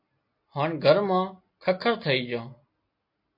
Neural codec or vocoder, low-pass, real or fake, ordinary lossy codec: none; 5.4 kHz; real; MP3, 32 kbps